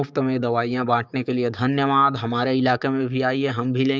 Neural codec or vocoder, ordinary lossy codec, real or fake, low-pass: codec, 16 kHz, 6 kbps, DAC; none; fake; none